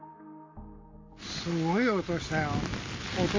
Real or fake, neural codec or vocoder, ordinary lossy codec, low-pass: real; none; MP3, 32 kbps; 7.2 kHz